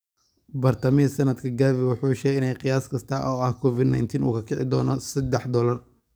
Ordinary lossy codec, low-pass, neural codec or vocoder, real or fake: none; none; codec, 44.1 kHz, 7.8 kbps, DAC; fake